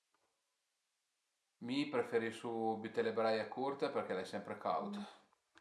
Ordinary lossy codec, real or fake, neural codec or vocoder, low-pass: none; real; none; none